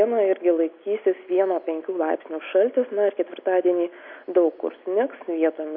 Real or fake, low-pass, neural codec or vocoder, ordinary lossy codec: real; 5.4 kHz; none; MP3, 32 kbps